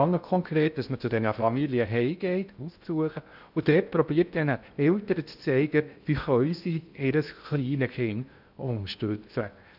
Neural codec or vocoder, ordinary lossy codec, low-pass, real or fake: codec, 16 kHz in and 24 kHz out, 0.6 kbps, FocalCodec, streaming, 2048 codes; none; 5.4 kHz; fake